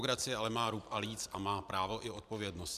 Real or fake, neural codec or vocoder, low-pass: fake; vocoder, 44.1 kHz, 128 mel bands every 512 samples, BigVGAN v2; 14.4 kHz